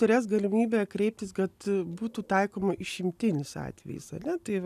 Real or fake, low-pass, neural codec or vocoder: real; 14.4 kHz; none